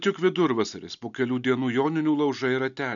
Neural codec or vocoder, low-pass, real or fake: none; 7.2 kHz; real